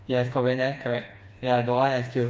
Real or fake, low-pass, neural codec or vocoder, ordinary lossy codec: fake; none; codec, 16 kHz, 2 kbps, FreqCodec, smaller model; none